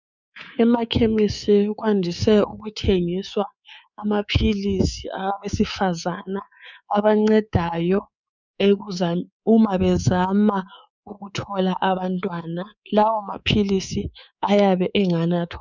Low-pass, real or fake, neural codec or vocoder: 7.2 kHz; fake; codec, 24 kHz, 3.1 kbps, DualCodec